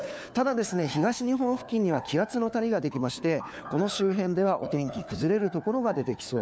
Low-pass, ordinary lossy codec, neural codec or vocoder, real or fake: none; none; codec, 16 kHz, 4 kbps, FunCodec, trained on LibriTTS, 50 frames a second; fake